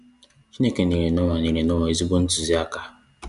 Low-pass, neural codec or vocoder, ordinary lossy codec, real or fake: 10.8 kHz; none; none; real